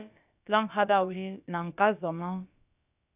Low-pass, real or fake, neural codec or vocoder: 3.6 kHz; fake; codec, 16 kHz, about 1 kbps, DyCAST, with the encoder's durations